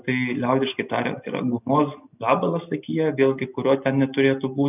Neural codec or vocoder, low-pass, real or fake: none; 3.6 kHz; real